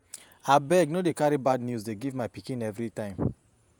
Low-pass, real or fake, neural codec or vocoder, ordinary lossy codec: none; fake; vocoder, 48 kHz, 128 mel bands, Vocos; none